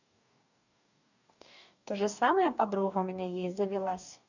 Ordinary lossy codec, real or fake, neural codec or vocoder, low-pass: none; fake; codec, 44.1 kHz, 2.6 kbps, DAC; 7.2 kHz